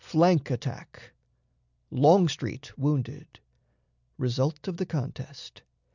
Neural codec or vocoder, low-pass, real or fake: none; 7.2 kHz; real